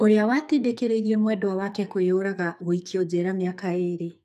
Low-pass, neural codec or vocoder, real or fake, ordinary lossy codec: 14.4 kHz; codec, 44.1 kHz, 2.6 kbps, SNAC; fake; none